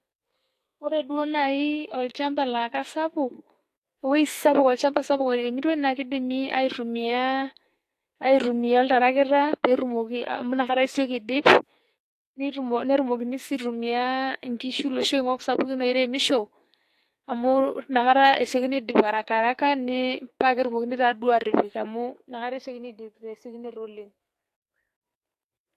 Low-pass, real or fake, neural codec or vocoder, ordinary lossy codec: 14.4 kHz; fake; codec, 44.1 kHz, 2.6 kbps, SNAC; AAC, 64 kbps